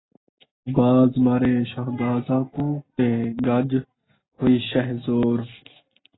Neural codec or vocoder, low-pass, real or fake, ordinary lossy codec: none; 7.2 kHz; real; AAC, 16 kbps